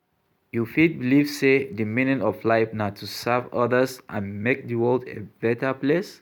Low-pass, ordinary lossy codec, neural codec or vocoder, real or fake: 19.8 kHz; none; none; real